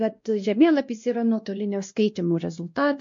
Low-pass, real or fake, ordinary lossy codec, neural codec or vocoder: 7.2 kHz; fake; MP3, 48 kbps; codec, 16 kHz, 1 kbps, X-Codec, WavLM features, trained on Multilingual LibriSpeech